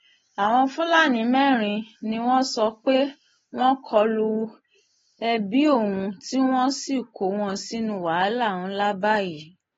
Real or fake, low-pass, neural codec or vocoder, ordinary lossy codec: real; 7.2 kHz; none; AAC, 24 kbps